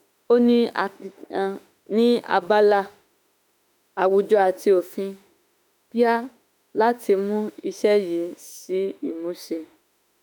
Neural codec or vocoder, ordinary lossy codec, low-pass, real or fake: autoencoder, 48 kHz, 32 numbers a frame, DAC-VAE, trained on Japanese speech; none; none; fake